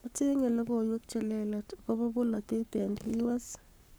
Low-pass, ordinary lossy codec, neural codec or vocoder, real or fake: none; none; codec, 44.1 kHz, 7.8 kbps, Pupu-Codec; fake